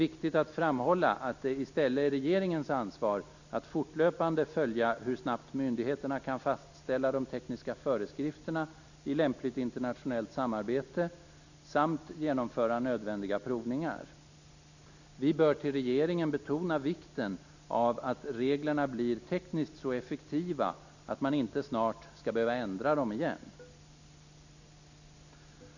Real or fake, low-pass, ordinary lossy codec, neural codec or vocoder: real; 7.2 kHz; none; none